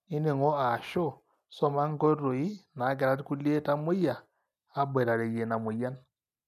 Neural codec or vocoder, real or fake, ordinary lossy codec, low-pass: none; real; none; 14.4 kHz